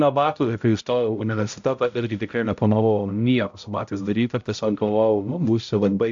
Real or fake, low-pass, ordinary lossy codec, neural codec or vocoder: fake; 7.2 kHz; AAC, 64 kbps; codec, 16 kHz, 0.5 kbps, X-Codec, HuBERT features, trained on balanced general audio